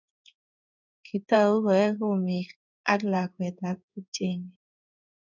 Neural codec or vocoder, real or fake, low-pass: codec, 16 kHz in and 24 kHz out, 1 kbps, XY-Tokenizer; fake; 7.2 kHz